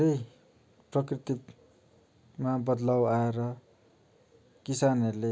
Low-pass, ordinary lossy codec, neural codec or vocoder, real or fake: none; none; none; real